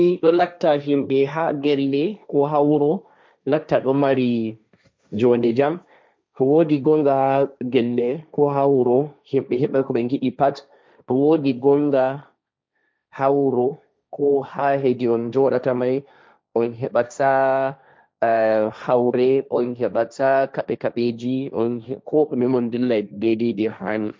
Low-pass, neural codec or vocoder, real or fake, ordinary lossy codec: none; codec, 16 kHz, 1.1 kbps, Voila-Tokenizer; fake; none